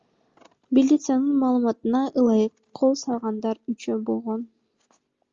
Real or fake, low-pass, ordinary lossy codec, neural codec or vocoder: real; 7.2 kHz; Opus, 32 kbps; none